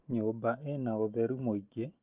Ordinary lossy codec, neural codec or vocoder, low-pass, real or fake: Opus, 24 kbps; none; 3.6 kHz; real